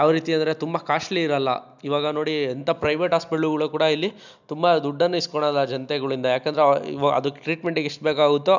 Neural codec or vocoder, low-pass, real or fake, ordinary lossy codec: none; 7.2 kHz; real; none